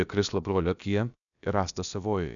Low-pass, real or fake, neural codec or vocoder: 7.2 kHz; fake; codec, 16 kHz, about 1 kbps, DyCAST, with the encoder's durations